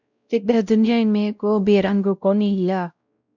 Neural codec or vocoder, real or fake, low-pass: codec, 16 kHz, 0.5 kbps, X-Codec, WavLM features, trained on Multilingual LibriSpeech; fake; 7.2 kHz